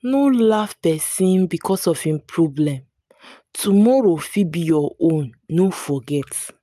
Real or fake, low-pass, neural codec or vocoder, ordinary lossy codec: real; 14.4 kHz; none; none